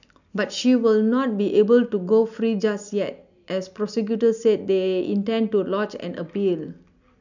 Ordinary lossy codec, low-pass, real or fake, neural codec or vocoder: none; 7.2 kHz; real; none